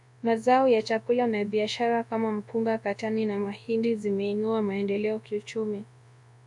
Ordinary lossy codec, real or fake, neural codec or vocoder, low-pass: AAC, 64 kbps; fake; codec, 24 kHz, 0.9 kbps, WavTokenizer, large speech release; 10.8 kHz